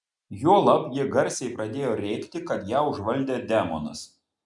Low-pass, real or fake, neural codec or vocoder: 10.8 kHz; real; none